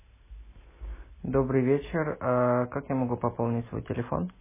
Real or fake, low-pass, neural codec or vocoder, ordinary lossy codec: real; 3.6 kHz; none; MP3, 16 kbps